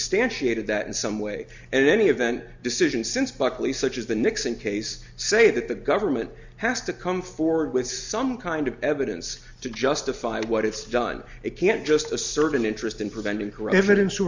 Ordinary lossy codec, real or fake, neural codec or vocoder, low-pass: Opus, 64 kbps; real; none; 7.2 kHz